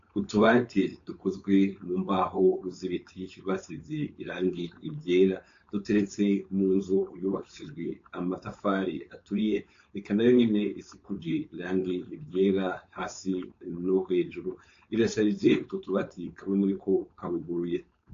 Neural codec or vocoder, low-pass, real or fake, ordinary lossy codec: codec, 16 kHz, 4.8 kbps, FACodec; 7.2 kHz; fake; AAC, 48 kbps